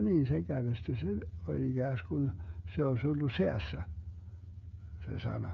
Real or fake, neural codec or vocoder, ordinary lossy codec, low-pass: fake; codec, 16 kHz, 8 kbps, FreqCodec, smaller model; none; 7.2 kHz